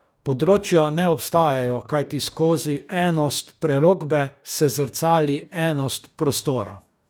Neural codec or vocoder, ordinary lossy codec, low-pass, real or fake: codec, 44.1 kHz, 2.6 kbps, DAC; none; none; fake